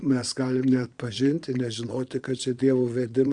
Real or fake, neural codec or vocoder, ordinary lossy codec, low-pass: fake; vocoder, 22.05 kHz, 80 mel bands, Vocos; Opus, 64 kbps; 9.9 kHz